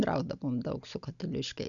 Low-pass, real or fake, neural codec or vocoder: 7.2 kHz; real; none